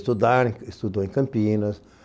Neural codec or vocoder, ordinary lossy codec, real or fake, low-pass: none; none; real; none